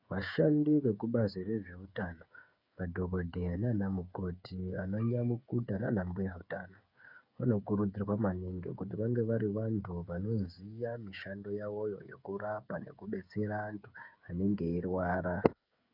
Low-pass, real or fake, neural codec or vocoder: 5.4 kHz; fake; codec, 16 kHz, 8 kbps, FreqCodec, smaller model